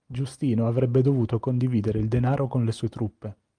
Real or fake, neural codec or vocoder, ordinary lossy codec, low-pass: real; none; Opus, 32 kbps; 9.9 kHz